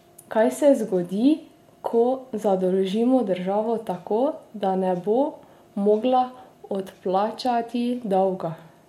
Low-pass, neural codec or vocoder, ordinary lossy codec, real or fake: 19.8 kHz; none; MP3, 64 kbps; real